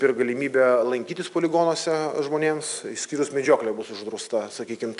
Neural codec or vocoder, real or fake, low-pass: none; real; 10.8 kHz